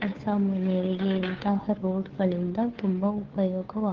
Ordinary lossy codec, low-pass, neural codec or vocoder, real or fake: Opus, 16 kbps; 7.2 kHz; codec, 16 kHz, 2 kbps, FunCodec, trained on Chinese and English, 25 frames a second; fake